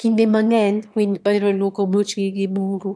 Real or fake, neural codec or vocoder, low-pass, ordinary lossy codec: fake; autoencoder, 22.05 kHz, a latent of 192 numbers a frame, VITS, trained on one speaker; none; none